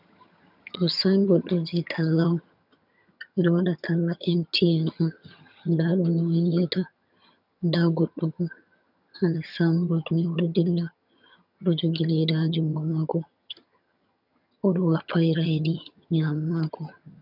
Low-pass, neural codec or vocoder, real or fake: 5.4 kHz; vocoder, 22.05 kHz, 80 mel bands, HiFi-GAN; fake